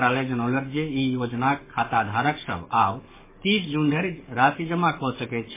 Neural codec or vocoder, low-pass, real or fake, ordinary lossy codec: codec, 44.1 kHz, 7.8 kbps, DAC; 3.6 kHz; fake; MP3, 16 kbps